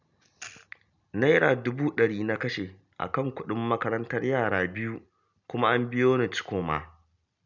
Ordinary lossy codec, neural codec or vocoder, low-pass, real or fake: none; none; 7.2 kHz; real